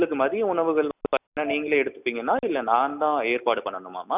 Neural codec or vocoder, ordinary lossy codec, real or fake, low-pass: none; none; real; 3.6 kHz